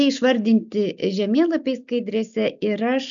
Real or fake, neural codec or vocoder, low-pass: real; none; 7.2 kHz